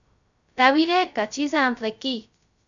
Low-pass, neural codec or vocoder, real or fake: 7.2 kHz; codec, 16 kHz, 0.2 kbps, FocalCodec; fake